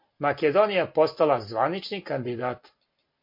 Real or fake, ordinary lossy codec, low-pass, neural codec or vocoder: real; MP3, 32 kbps; 5.4 kHz; none